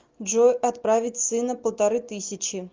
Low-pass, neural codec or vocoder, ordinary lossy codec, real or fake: 7.2 kHz; none; Opus, 32 kbps; real